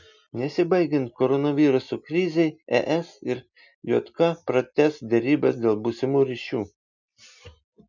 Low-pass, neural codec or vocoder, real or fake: 7.2 kHz; none; real